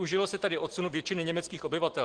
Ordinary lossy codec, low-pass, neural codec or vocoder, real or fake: Opus, 16 kbps; 9.9 kHz; none; real